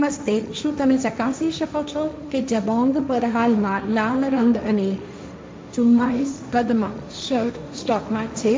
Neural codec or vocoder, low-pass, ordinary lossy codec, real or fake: codec, 16 kHz, 1.1 kbps, Voila-Tokenizer; none; none; fake